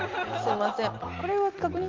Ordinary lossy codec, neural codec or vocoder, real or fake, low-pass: Opus, 24 kbps; none; real; 7.2 kHz